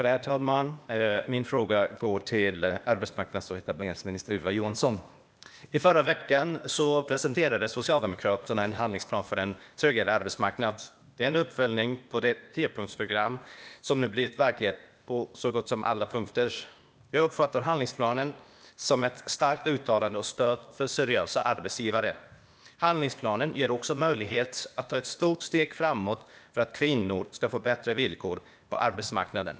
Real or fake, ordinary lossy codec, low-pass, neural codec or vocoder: fake; none; none; codec, 16 kHz, 0.8 kbps, ZipCodec